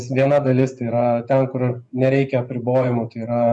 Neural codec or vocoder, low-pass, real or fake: vocoder, 24 kHz, 100 mel bands, Vocos; 10.8 kHz; fake